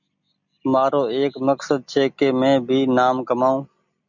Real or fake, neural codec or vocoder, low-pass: real; none; 7.2 kHz